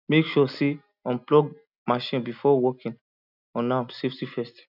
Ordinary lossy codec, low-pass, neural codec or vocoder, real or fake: none; 5.4 kHz; none; real